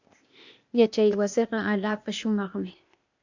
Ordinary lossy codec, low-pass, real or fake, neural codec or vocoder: AAC, 48 kbps; 7.2 kHz; fake; codec, 16 kHz, 0.8 kbps, ZipCodec